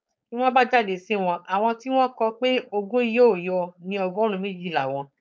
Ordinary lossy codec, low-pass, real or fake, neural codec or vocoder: none; none; fake; codec, 16 kHz, 4.8 kbps, FACodec